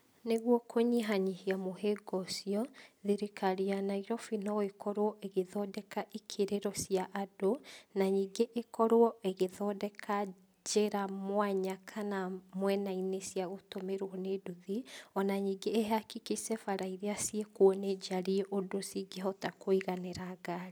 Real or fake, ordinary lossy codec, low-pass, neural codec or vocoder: real; none; none; none